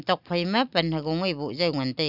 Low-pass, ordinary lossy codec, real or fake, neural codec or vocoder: 5.4 kHz; none; real; none